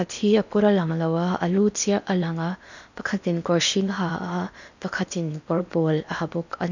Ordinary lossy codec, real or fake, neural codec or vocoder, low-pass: none; fake; codec, 16 kHz in and 24 kHz out, 0.8 kbps, FocalCodec, streaming, 65536 codes; 7.2 kHz